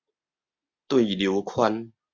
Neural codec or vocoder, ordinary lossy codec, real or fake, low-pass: none; Opus, 64 kbps; real; 7.2 kHz